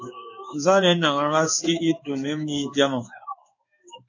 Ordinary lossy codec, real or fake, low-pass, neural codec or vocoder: AAC, 48 kbps; fake; 7.2 kHz; codec, 16 kHz in and 24 kHz out, 1 kbps, XY-Tokenizer